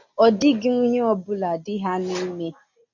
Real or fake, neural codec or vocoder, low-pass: real; none; 7.2 kHz